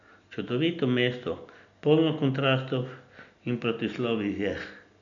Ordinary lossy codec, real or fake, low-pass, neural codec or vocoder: none; real; 7.2 kHz; none